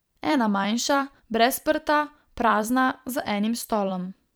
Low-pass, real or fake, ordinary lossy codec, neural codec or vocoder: none; fake; none; vocoder, 44.1 kHz, 128 mel bands every 256 samples, BigVGAN v2